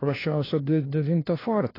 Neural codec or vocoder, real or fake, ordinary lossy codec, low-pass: codec, 16 kHz, 1 kbps, FunCodec, trained on LibriTTS, 50 frames a second; fake; AAC, 24 kbps; 5.4 kHz